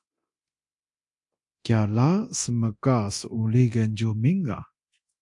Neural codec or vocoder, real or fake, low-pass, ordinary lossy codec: codec, 24 kHz, 0.9 kbps, DualCodec; fake; 10.8 kHz; AAC, 64 kbps